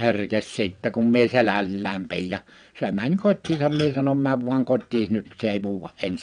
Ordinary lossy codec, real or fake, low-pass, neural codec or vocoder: none; fake; 9.9 kHz; vocoder, 22.05 kHz, 80 mel bands, WaveNeXt